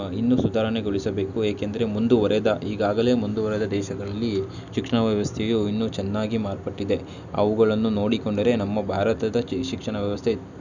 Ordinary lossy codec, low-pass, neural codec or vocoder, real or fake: none; 7.2 kHz; none; real